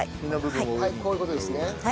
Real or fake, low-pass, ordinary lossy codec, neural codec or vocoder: real; none; none; none